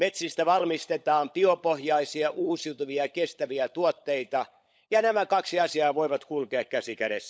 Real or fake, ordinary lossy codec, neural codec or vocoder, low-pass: fake; none; codec, 16 kHz, 16 kbps, FunCodec, trained on LibriTTS, 50 frames a second; none